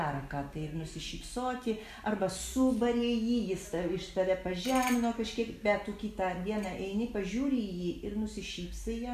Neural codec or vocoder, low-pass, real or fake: none; 14.4 kHz; real